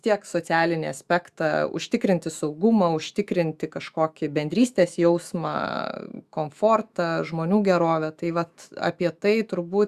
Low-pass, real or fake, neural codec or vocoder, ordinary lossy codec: 14.4 kHz; fake; autoencoder, 48 kHz, 128 numbers a frame, DAC-VAE, trained on Japanese speech; Opus, 64 kbps